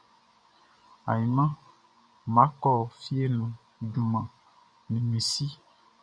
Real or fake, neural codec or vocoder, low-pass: fake; vocoder, 24 kHz, 100 mel bands, Vocos; 9.9 kHz